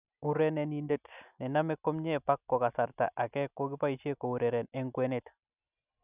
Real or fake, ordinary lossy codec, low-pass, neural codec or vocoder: real; none; 3.6 kHz; none